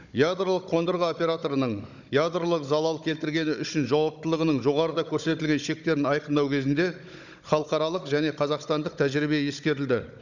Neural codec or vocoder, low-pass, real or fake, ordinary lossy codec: codec, 16 kHz, 16 kbps, FunCodec, trained on Chinese and English, 50 frames a second; 7.2 kHz; fake; none